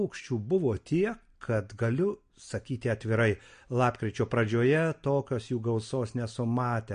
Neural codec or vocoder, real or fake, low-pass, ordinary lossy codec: none; real; 9.9 kHz; MP3, 48 kbps